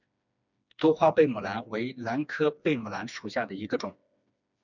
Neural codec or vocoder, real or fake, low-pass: codec, 16 kHz, 2 kbps, FreqCodec, smaller model; fake; 7.2 kHz